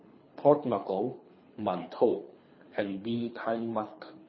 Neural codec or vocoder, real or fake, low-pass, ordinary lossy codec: codec, 24 kHz, 3 kbps, HILCodec; fake; 7.2 kHz; MP3, 24 kbps